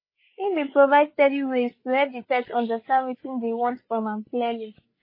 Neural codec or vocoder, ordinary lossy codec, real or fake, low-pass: codec, 16 kHz, 8 kbps, FreqCodec, larger model; MP3, 24 kbps; fake; 5.4 kHz